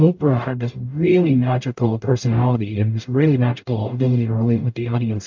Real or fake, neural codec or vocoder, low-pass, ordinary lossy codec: fake; codec, 44.1 kHz, 0.9 kbps, DAC; 7.2 kHz; MP3, 48 kbps